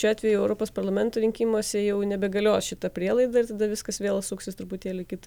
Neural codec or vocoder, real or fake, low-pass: none; real; 19.8 kHz